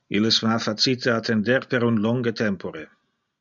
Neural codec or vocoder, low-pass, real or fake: none; 7.2 kHz; real